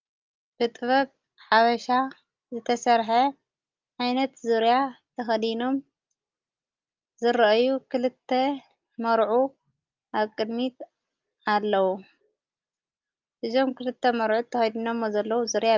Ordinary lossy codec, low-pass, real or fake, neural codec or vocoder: Opus, 24 kbps; 7.2 kHz; real; none